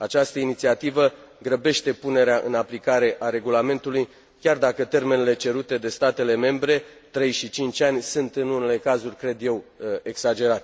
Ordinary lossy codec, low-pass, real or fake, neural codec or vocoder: none; none; real; none